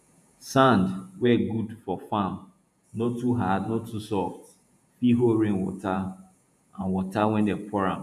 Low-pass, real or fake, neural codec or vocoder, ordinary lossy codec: 14.4 kHz; fake; vocoder, 48 kHz, 128 mel bands, Vocos; none